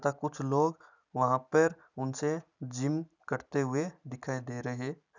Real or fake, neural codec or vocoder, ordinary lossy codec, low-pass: real; none; none; 7.2 kHz